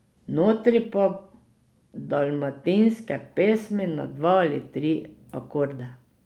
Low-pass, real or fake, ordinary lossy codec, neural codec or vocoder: 19.8 kHz; real; Opus, 32 kbps; none